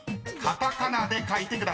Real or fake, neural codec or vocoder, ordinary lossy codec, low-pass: real; none; none; none